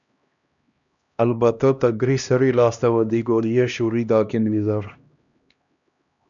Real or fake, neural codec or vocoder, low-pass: fake; codec, 16 kHz, 1 kbps, X-Codec, HuBERT features, trained on LibriSpeech; 7.2 kHz